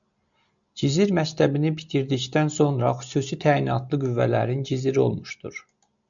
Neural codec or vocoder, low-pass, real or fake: none; 7.2 kHz; real